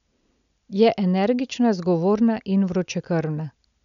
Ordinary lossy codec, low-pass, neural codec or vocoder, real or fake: none; 7.2 kHz; none; real